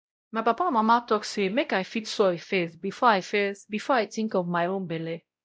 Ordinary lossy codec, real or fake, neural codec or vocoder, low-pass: none; fake; codec, 16 kHz, 0.5 kbps, X-Codec, WavLM features, trained on Multilingual LibriSpeech; none